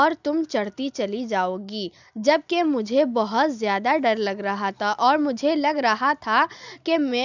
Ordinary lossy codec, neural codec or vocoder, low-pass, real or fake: none; none; 7.2 kHz; real